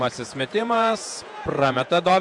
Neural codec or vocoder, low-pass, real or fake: vocoder, 48 kHz, 128 mel bands, Vocos; 10.8 kHz; fake